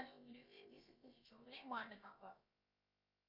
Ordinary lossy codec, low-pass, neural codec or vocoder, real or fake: MP3, 32 kbps; 5.4 kHz; codec, 16 kHz, about 1 kbps, DyCAST, with the encoder's durations; fake